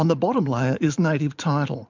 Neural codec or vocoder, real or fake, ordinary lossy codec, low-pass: vocoder, 44.1 kHz, 128 mel bands every 512 samples, BigVGAN v2; fake; MP3, 64 kbps; 7.2 kHz